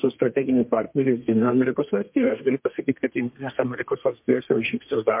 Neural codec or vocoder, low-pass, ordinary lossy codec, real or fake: codec, 32 kHz, 1.9 kbps, SNAC; 3.6 kHz; MP3, 32 kbps; fake